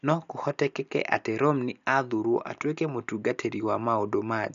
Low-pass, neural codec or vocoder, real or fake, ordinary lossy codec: 7.2 kHz; none; real; none